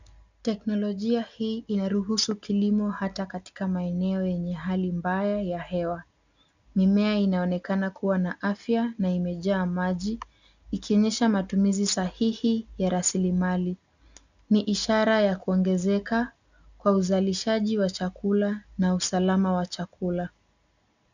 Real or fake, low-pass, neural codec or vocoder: real; 7.2 kHz; none